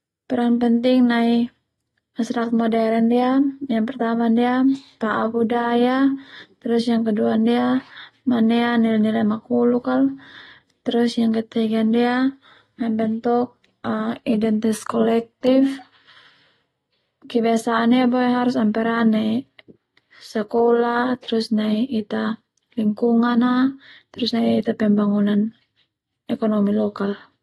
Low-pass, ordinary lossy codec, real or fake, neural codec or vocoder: 19.8 kHz; AAC, 32 kbps; real; none